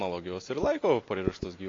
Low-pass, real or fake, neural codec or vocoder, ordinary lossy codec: 7.2 kHz; real; none; AAC, 32 kbps